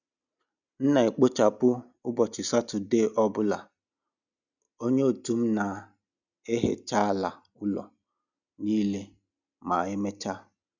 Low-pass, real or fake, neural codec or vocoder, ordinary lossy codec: 7.2 kHz; real; none; none